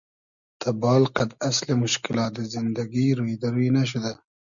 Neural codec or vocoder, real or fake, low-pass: none; real; 7.2 kHz